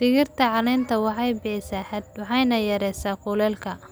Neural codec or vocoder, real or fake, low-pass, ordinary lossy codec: none; real; none; none